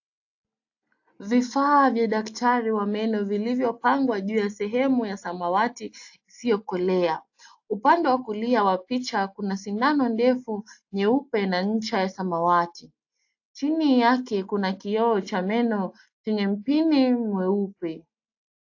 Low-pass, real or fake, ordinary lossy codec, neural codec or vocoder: 7.2 kHz; real; AAC, 48 kbps; none